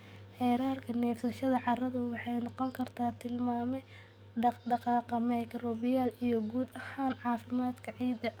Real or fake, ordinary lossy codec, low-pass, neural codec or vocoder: fake; none; none; codec, 44.1 kHz, 7.8 kbps, DAC